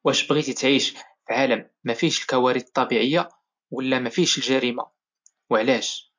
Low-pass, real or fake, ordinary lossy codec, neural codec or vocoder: 7.2 kHz; real; MP3, 48 kbps; none